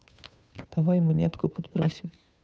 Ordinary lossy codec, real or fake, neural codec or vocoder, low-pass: none; fake; codec, 16 kHz, 2 kbps, FunCodec, trained on Chinese and English, 25 frames a second; none